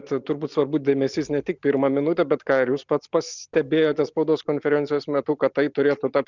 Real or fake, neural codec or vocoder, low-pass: real; none; 7.2 kHz